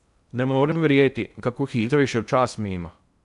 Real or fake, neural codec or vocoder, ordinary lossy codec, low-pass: fake; codec, 16 kHz in and 24 kHz out, 0.8 kbps, FocalCodec, streaming, 65536 codes; none; 10.8 kHz